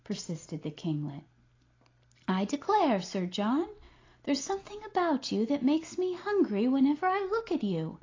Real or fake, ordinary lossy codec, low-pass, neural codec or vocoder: real; AAC, 32 kbps; 7.2 kHz; none